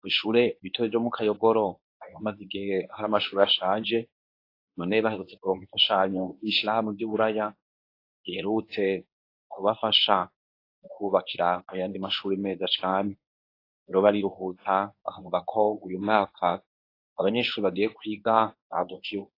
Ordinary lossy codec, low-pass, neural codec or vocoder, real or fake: AAC, 32 kbps; 5.4 kHz; codec, 24 kHz, 0.9 kbps, WavTokenizer, medium speech release version 1; fake